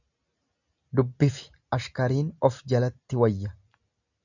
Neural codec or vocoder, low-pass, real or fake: none; 7.2 kHz; real